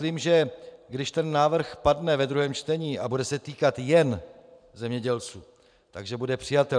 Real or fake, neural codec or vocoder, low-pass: fake; vocoder, 44.1 kHz, 128 mel bands every 512 samples, BigVGAN v2; 9.9 kHz